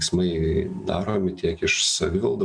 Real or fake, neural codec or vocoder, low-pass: real; none; 9.9 kHz